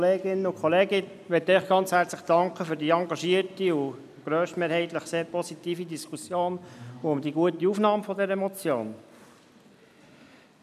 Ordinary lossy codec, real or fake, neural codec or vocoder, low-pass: none; real; none; 14.4 kHz